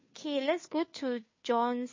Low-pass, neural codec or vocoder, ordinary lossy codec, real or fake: 7.2 kHz; codec, 16 kHz, 2 kbps, FunCodec, trained on Chinese and English, 25 frames a second; MP3, 32 kbps; fake